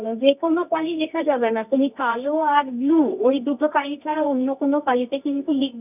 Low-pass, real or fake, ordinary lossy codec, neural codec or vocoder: 3.6 kHz; fake; none; codec, 24 kHz, 0.9 kbps, WavTokenizer, medium music audio release